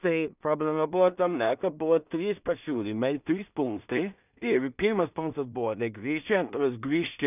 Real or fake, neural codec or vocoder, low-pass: fake; codec, 16 kHz in and 24 kHz out, 0.4 kbps, LongCat-Audio-Codec, two codebook decoder; 3.6 kHz